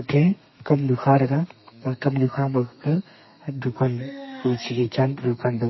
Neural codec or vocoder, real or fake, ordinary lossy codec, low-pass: codec, 32 kHz, 1.9 kbps, SNAC; fake; MP3, 24 kbps; 7.2 kHz